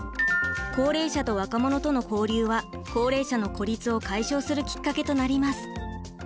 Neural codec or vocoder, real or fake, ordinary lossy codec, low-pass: none; real; none; none